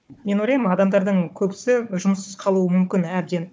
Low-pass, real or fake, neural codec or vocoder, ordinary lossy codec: none; fake; codec, 16 kHz, 4 kbps, FunCodec, trained on Chinese and English, 50 frames a second; none